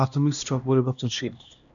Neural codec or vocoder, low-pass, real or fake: codec, 16 kHz, 1 kbps, X-Codec, HuBERT features, trained on LibriSpeech; 7.2 kHz; fake